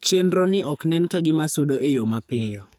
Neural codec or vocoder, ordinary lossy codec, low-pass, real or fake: codec, 44.1 kHz, 2.6 kbps, SNAC; none; none; fake